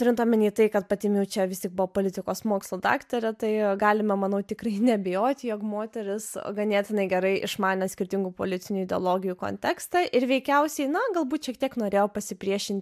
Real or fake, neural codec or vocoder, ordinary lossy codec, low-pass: real; none; MP3, 96 kbps; 14.4 kHz